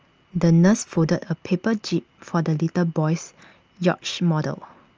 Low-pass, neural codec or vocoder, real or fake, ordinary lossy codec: 7.2 kHz; none; real; Opus, 24 kbps